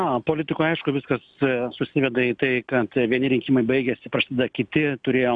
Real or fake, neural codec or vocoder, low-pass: real; none; 9.9 kHz